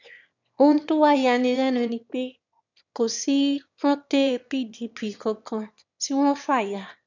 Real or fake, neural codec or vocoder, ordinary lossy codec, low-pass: fake; autoencoder, 22.05 kHz, a latent of 192 numbers a frame, VITS, trained on one speaker; none; 7.2 kHz